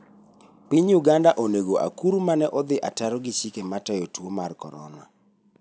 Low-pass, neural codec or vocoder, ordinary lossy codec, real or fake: none; none; none; real